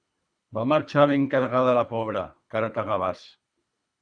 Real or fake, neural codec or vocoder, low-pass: fake; codec, 24 kHz, 3 kbps, HILCodec; 9.9 kHz